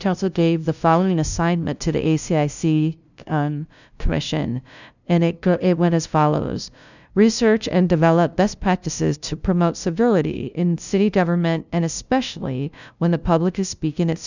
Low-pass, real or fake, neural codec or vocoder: 7.2 kHz; fake; codec, 16 kHz, 0.5 kbps, FunCodec, trained on LibriTTS, 25 frames a second